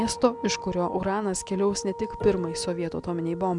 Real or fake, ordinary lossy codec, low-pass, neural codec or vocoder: real; MP3, 96 kbps; 10.8 kHz; none